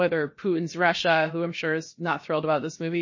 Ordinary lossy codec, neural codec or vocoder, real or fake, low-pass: MP3, 32 kbps; codec, 16 kHz, about 1 kbps, DyCAST, with the encoder's durations; fake; 7.2 kHz